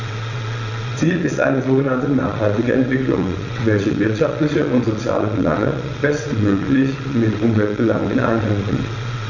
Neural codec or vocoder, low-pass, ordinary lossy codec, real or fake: vocoder, 22.05 kHz, 80 mel bands, WaveNeXt; 7.2 kHz; none; fake